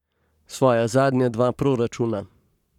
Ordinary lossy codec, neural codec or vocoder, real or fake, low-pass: none; codec, 44.1 kHz, 7.8 kbps, Pupu-Codec; fake; 19.8 kHz